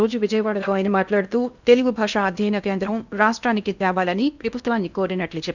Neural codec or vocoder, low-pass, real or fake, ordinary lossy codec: codec, 16 kHz in and 24 kHz out, 0.8 kbps, FocalCodec, streaming, 65536 codes; 7.2 kHz; fake; none